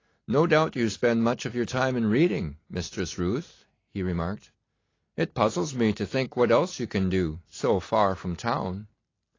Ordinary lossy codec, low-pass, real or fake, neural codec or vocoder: AAC, 32 kbps; 7.2 kHz; real; none